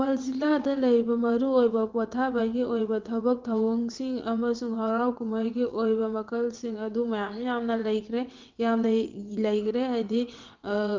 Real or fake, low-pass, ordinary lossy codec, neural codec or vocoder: fake; 7.2 kHz; Opus, 32 kbps; vocoder, 22.05 kHz, 80 mel bands, WaveNeXt